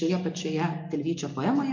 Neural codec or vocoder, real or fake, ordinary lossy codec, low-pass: none; real; MP3, 48 kbps; 7.2 kHz